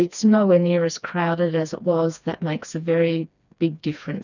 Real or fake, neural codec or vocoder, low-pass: fake; codec, 16 kHz, 2 kbps, FreqCodec, smaller model; 7.2 kHz